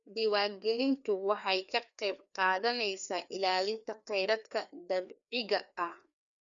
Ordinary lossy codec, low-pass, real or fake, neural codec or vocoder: none; 7.2 kHz; fake; codec, 16 kHz, 2 kbps, FreqCodec, larger model